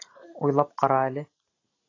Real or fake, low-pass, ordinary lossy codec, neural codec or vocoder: real; 7.2 kHz; AAC, 32 kbps; none